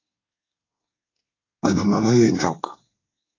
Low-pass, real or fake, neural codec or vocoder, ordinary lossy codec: 7.2 kHz; fake; codec, 24 kHz, 1 kbps, SNAC; AAC, 32 kbps